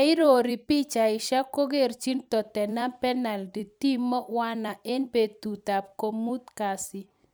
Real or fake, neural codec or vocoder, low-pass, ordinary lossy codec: fake; vocoder, 44.1 kHz, 128 mel bands every 256 samples, BigVGAN v2; none; none